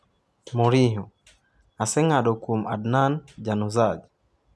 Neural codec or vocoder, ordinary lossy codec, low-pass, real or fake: none; none; none; real